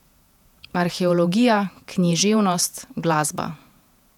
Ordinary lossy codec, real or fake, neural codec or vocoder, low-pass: none; fake; vocoder, 48 kHz, 128 mel bands, Vocos; 19.8 kHz